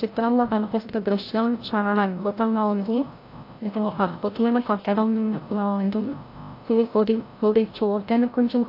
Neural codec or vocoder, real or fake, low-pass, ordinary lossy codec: codec, 16 kHz, 0.5 kbps, FreqCodec, larger model; fake; 5.4 kHz; AAC, 32 kbps